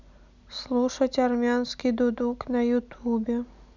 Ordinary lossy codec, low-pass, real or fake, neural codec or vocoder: none; 7.2 kHz; real; none